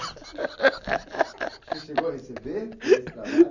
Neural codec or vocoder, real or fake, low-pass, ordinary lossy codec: none; real; 7.2 kHz; none